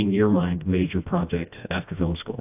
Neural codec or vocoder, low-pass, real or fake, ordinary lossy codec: codec, 16 kHz, 1 kbps, FreqCodec, smaller model; 3.6 kHz; fake; AAC, 32 kbps